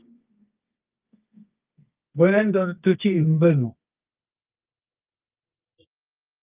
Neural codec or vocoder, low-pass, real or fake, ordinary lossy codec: codec, 24 kHz, 0.9 kbps, WavTokenizer, medium music audio release; 3.6 kHz; fake; Opus, 32 kbps